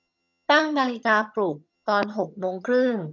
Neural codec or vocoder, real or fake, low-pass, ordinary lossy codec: vocoder, 22.05 kHz, 80 mel bands, HiFi-GAN; fake; 7.2 kHz; none